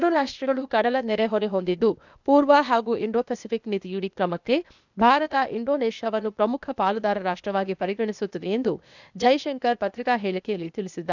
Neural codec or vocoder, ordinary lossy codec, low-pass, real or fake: codec, 16 kHz, 0.8 kbps, ZipCodec; none; 7.2 kHz; fake